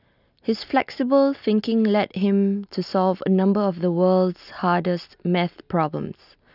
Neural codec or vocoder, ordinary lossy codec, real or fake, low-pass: none; none; real; 5.4 kHz